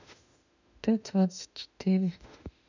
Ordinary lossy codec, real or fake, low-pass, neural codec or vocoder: none; fake; 7.2 kHz; autoencoder, 48 kHz, 32 numbers a frame, DAC-VAE, trained on Japanese speech